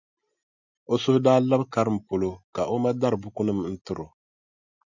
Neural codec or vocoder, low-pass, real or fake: none; 7.2 kHz; real